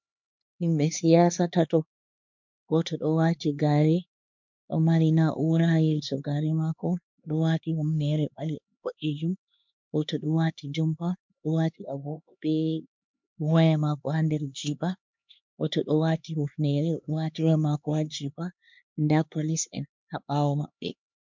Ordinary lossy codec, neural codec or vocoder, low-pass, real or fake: MP3, 64 kbps; codec, 16 kHz, 2 kbps, X-Codec, HuBERT features, trained on LibriSpeech; 7.2 kHz; fake